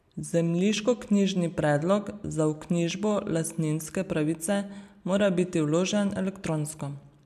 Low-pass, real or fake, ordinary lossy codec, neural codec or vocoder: 14.4 kHz; real; none; none